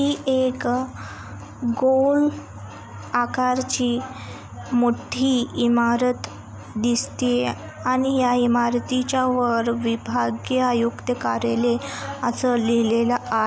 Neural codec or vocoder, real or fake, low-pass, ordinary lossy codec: none; real; none; none